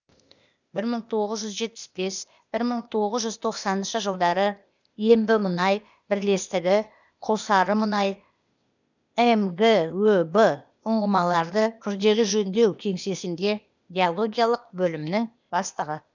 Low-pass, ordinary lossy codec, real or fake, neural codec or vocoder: 7.2 kHz; none; fake; codec, 16 kHz, 0.8 kbps, ZipCodec